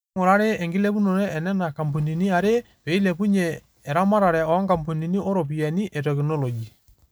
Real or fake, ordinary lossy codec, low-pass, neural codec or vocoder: real; none; none; none